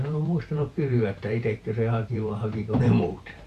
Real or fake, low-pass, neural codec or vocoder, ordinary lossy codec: fake; 14.4 kHz; vocoder, 44.1 kHz, 128 mel bands every 512 samples, BigVGAN v2; AAC, 96 kbps